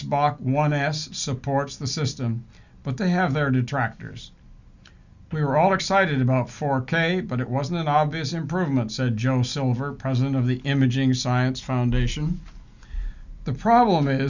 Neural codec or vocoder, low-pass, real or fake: none; 7.2 kHz; real